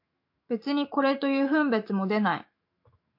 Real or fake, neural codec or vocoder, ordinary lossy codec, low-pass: fake; autoencoder, 48 kHz, 128 numbers a frame, DAC-VAE, trained on Japanese speech; MP3, 32 kbps; 5.4 kHz